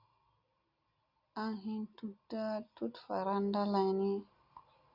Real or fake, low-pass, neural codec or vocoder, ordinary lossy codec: real; 5.4 kHz; none; Opus, 64 kbps